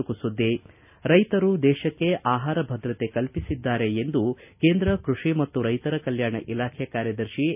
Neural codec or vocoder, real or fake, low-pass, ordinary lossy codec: none; real; 3.6 kHz; none